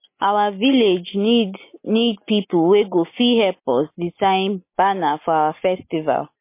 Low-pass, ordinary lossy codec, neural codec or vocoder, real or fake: 3.6 kHz; MP3, 24 kbps; none; real